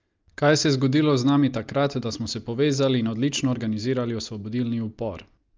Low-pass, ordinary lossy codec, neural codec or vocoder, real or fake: 7.2 kHz; Opus, 32 kbps; none; real